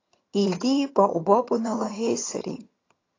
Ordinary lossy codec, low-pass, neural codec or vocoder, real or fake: AAC, 32 kbps; 7.2 kHz; vocoder, 22.05 kHz, 80 mel bands, HiFi-GAN; fake